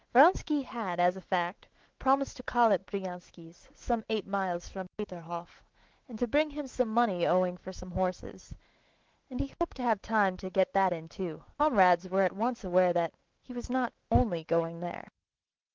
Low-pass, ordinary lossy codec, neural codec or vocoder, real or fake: 7.2 kHz; Opus, 16 kbps; autoencoder, 48 kHz, 128 numbers a frame, DAC-VAE, trained on Japanese speech; fake